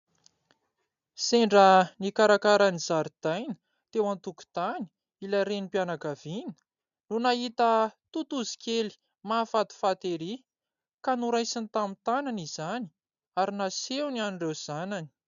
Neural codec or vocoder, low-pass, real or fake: none; 7.2 kHz; real